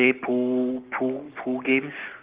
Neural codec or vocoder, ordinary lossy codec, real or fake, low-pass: codec, 44.1 kHz, 7.8 kbps, Pupu-Codec; Opus, 32 kbps; fake; 3.6 kHz